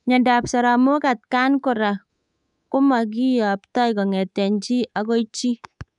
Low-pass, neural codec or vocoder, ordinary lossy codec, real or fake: 10.8 kHz; codec, 24 kHz, 3.1 kbps, DualCodec; none; fake